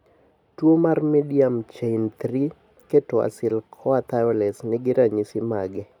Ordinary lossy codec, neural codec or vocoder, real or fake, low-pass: none; none; real; 19.8 kHz